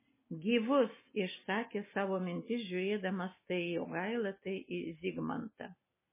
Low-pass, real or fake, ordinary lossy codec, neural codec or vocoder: 3.6 kHz; real; MP3, 16 kbps; none